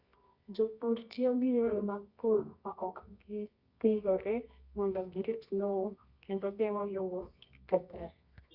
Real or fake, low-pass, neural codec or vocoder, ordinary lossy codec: fake; 5.4 kHz; codec, 24 kHz, 0.9 kbps, WavTokenizer, medium music audio release; Opus, 64 kbps